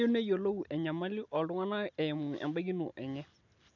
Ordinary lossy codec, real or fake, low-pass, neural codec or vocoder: none; real; 7.2 kHz; none